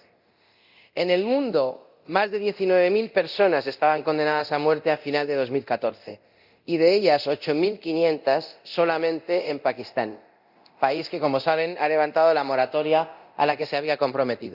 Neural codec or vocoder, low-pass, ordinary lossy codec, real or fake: codec, 24 kHz, 0.9 kbps, DualCodec; 5.4 kHz; Opus, 64 kbps; fake